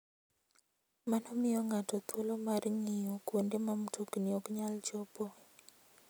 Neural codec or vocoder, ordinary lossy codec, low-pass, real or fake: none; none; none; real